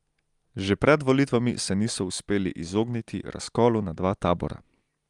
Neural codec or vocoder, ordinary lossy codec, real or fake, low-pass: none; Opus, 32 kbps; real; 10.8 kHz